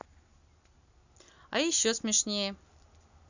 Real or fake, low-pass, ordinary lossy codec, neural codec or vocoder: real; 7.2 kHz; none; none